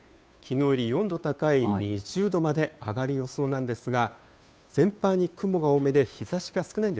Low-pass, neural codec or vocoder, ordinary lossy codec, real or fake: none; codec, 16 kHz, 2 kbps, FunCodec, trained on Chinese and English, 25 frames a second; none; fake